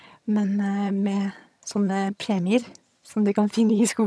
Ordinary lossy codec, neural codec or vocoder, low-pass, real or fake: none; vocoder, 22.05 kHz, 80 mel bands, HiFi-GAN; none; fake